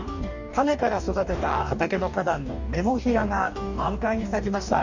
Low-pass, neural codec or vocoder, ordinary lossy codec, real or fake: 7.2 kHz; codec, 44.1 kHz, 2.6 kbps, DAC; none; fake